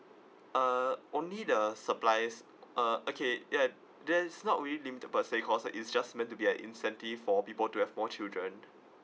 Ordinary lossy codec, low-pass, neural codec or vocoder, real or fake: none; none; none; real